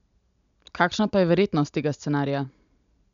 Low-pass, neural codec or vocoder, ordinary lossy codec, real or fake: 7.2 kHz; none; none; real